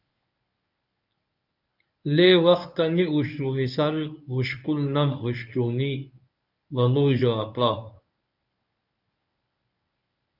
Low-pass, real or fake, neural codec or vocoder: 5.4 kHz; fake; codec, 24 kHz, 0.9 kbps, WavTokenizer, medium speech release version 1